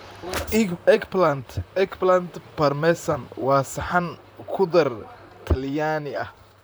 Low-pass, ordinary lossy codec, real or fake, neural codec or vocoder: none; none; fake; vocoder, 44.1 kHz, 128 mel bands, Pupu-Vocoder